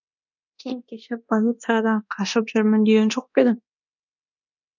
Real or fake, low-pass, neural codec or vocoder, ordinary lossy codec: fake; 7.2 kHz; codec, 24 kHz, 0.9 kbps, DualCodec; none